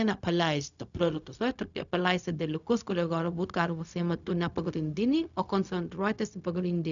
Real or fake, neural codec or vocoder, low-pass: fake; codec, 16 kHz, 0.4 kbps, LongCat-Audio-Codec; 7.2 kHz